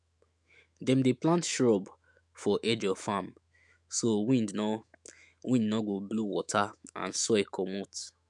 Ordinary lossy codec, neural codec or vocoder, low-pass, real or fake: none; autoencoder, 48 kHz, 128 numbers a frame, DAC-VAE, trained on Japanese speech; 10.8 kHz; fake